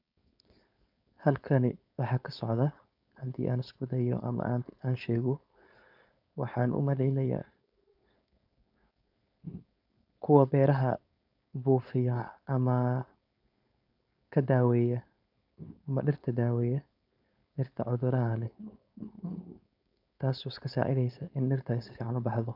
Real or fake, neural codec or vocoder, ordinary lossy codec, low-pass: fake; codec, 16 kHz, 4.8 kbps, FACodec; none; 5.4 kHz